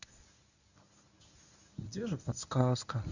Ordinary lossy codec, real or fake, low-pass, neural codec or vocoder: none; fake; 7.2 kHz; codec, 24 kHz, 0.9 kbps, WavTokenizer, medium speech release version 1